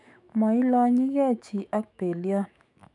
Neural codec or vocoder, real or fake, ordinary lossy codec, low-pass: autoencoder, 48 kHz, 128 numbers a frame, DAC-VAE, trained on Japanese speech; fake; none; 10.8 kHz